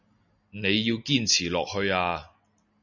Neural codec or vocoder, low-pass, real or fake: none; 7.2 kHz; real